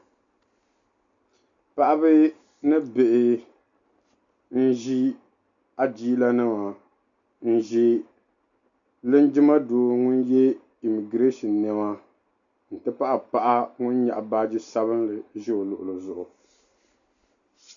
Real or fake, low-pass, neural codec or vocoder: real; 7.2 kHz; none